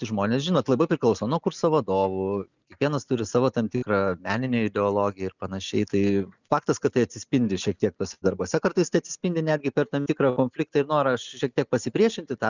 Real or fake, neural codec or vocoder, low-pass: real; none; 7.2 kHz